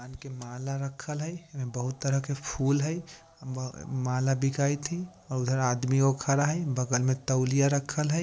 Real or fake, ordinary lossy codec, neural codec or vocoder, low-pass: real; none; none; none